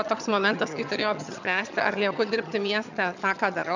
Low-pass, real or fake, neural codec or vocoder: 7.2 kHz; fake; vocoder, 22.05 kHz, 80 mel bands, HiFi-GAN